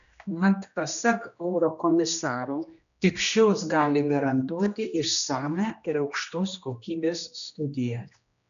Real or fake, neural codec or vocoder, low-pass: fake; codec, 16 kHz, 1 kbps, X-Codec, HuBERT features, trained on general audio; 7.2 kHz